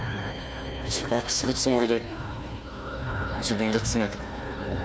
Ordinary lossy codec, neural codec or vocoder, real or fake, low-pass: none; codec, 16 kHz, 1 kbps, FunCodec, trained on Chinese and English, 50 frames a second; fake; none